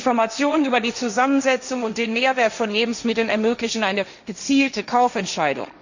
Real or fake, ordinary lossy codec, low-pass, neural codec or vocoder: fake; none; 7.2 kHz; codec, 16 kHz, 1.1 kbps, Voila-Tokenizer